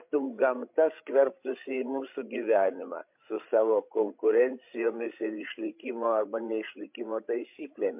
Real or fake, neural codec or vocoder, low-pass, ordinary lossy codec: fake; codec, 16 kHz, 16 kbps, FreqCodec, larger model; 3.6 kHz; MP3, 32 kbps